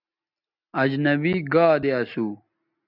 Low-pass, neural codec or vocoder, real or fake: 5.4 kHz; none; real